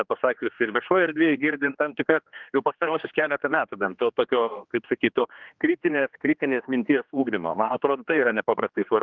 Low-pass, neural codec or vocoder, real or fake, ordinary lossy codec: 7.2 kHz; codec, 16 kHz, 2 kbps, X-Codec, HuBERT features, trained on general audio; fake; Opus, 32 kbps